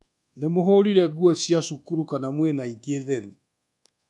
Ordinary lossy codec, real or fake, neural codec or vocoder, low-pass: none; fake; codec, 24 kHz, 1.2 kbps, DualCodec; none